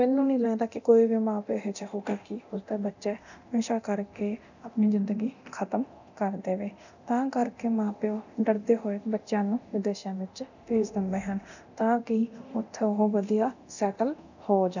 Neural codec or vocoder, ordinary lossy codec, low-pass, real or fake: codec, 24 kHz, 0.9 kbps, DualCodec; none; 7.2 kHz; fake